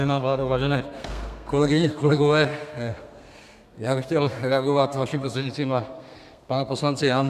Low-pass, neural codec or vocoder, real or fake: 14.4 kHz; codec, 32 kHz, 1.9 kbps, SNAC; fake